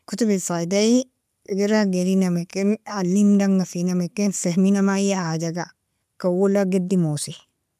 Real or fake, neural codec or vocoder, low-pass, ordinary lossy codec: real; none; 14.4 kHz; none